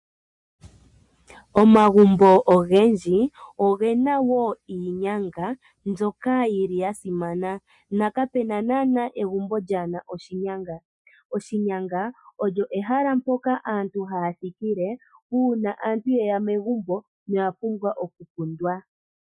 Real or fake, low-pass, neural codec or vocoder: real; 10.8 kHz; none